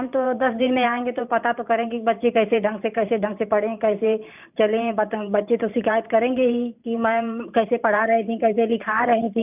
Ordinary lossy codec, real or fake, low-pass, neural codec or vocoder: none; fake; 3.6 kHz; vocoder, 44.1 kHz, 128 mel bands every 512 samples, BigVGAN v2